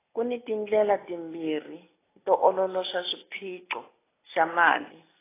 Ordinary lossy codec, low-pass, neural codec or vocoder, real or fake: AAC, 16 kbps; 3.6 kHz; none; real